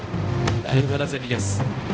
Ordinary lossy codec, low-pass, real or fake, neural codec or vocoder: none; none; fake; codec, 16 kHz, 0.5 kbps, X-Codec, HuBERT features, trained on balanced general audio